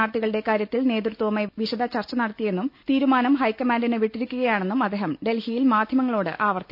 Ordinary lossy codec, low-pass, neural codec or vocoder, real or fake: none; 5.4 kHz; none; real